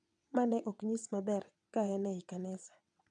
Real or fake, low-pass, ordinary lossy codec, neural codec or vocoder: fake; 9.9 kHz; none; vocoder, 22.05 kHz, 80 mel bands, WaveNeXt